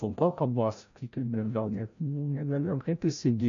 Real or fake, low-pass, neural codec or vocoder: fake; 7.2 kHz; codec, 16 kHz, 0.5 kbps, FreqCodec, larger model